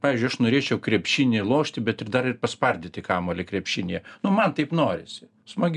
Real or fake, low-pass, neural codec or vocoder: real; 10.8 kHz; none